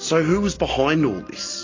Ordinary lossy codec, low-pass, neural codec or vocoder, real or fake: AAC, 32 kbps; 7.2 kHz; none; real